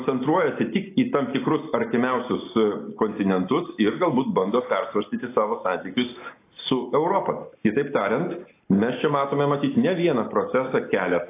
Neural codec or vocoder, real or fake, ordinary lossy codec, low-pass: none; real; AAC, 24 kbps; 3.6 kHz